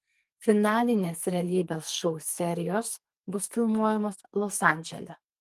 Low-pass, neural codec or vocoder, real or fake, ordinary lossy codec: 14.4 kHz; codec, 44.1 kHz, 2.6 kbps, SNAC; fake; Opus, 32 kbps